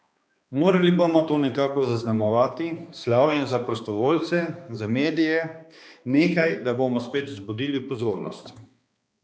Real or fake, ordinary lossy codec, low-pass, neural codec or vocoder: fake; none; none; codec, 16 kHz, 2 kbps, X-Codec, HuBERT features, trained on balanced general audio